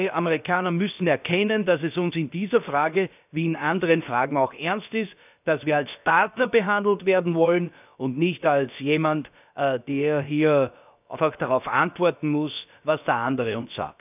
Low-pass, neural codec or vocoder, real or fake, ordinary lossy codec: 3.6 kHz; codec, 16 kHz, about 1 kbps, DyCAST, with the encoder's durations; fake; none